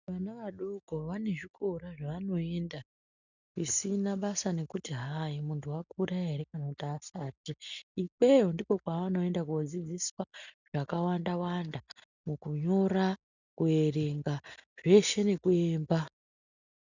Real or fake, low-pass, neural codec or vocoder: real; 7.2 kHz; none